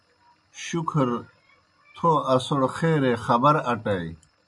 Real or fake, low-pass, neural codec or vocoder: real; 10.8 kHz; none